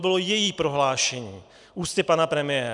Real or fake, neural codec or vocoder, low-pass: real; none; 10.8 kHz